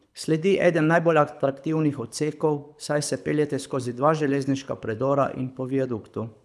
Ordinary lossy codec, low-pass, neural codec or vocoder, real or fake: none; none; codec, 24 kHz, 6 kbps, HILCodec; fake